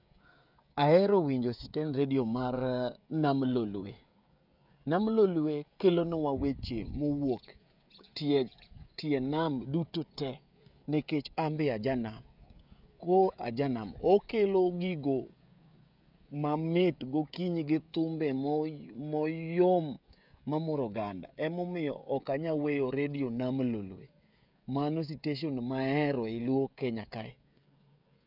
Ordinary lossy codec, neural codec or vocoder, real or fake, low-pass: none; codec, 16 kHz, 16 kbps, FreqCodec, smaller model; fake; 5.4 kHz